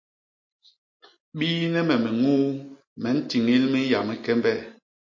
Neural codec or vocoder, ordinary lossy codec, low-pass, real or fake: none; MP3, 32 kbps; 7.2 kHz; real